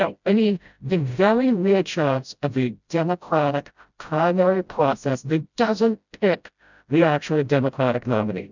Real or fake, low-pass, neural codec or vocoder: fake; 7.2 kHz; codec, 16 kHz, 0.5 kbps, FreqCodec, smaller model